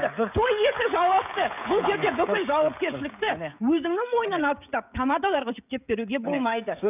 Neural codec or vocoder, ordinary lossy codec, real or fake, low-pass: codec, 16 kHz, 16 kbps, FreqCodec, smaller model; none; fake; 3.6 kHz